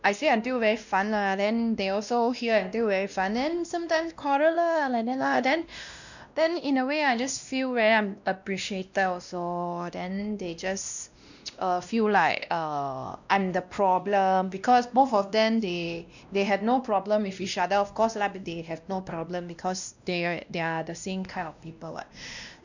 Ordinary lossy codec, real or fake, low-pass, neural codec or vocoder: none; fake; 7.2 kHz; codec, 16 kHz, 1 kbps, X-Codec, WavLM features, trained on Multilingual LibriSpeech